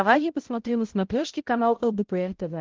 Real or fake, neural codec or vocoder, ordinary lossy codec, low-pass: fake; codec, 16 kHz, 0.5 kbps, X-Codec, HuBERT features, trained on balanced general audio; Opus, 16 kbps; 7.2 kHz